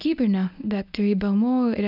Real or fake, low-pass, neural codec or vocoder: fake; 5.4 kHz; codec, 24 kHz, 0.9 kbps, WavTokenizer, medium speech release version 1